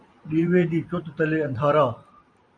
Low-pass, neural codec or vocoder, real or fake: 9.9 kHz; vocoder, 44.1 kHz, 128 mel bands every 512 samples, BigVGAN v2; fake